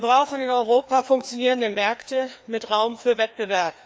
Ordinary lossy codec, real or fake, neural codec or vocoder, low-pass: none; fake; codec, 16 kHz, 2 kbps, FreqCodec, larger model; none